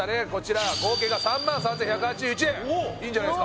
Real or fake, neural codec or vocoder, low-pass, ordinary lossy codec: real; none; none; none